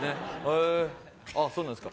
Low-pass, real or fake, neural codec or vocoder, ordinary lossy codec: none; real; none; none